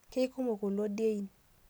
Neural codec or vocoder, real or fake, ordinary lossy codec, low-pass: none; real; none; none